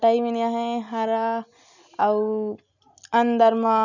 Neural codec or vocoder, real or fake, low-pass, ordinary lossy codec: none; real; 7.2 kHz; none